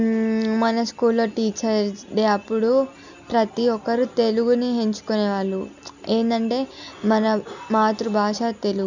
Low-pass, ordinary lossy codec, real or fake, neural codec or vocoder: 7.2 kHz; none; real; none